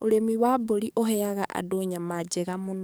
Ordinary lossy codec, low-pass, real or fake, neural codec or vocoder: none; none; fake; codec, 44.1 kHz, 7.8 kbps, DAC